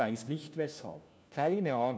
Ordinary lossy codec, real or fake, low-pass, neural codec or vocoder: none; fake; none; codec, 16 kHz, 1 kbps, FunCodec, trained on LibriTTS, 50 frames a second